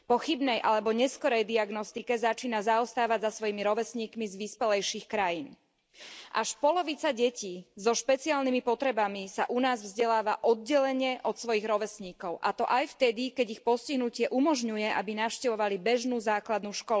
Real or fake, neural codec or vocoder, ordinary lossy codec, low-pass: real; none; none; none